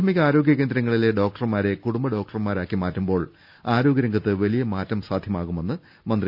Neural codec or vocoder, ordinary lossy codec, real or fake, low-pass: none; none; real; 5.4 kHz